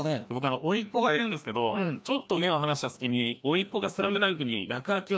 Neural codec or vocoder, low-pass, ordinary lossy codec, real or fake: codec, 16 kHz, 1 kbps, FreqCodec, larger model; none; none; fake